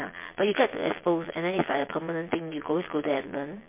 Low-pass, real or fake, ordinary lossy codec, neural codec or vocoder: 3.6 kHz; fake; MP3, 24 kbps; vocoder, 22.05 kHz, 80 mel bands, Vocos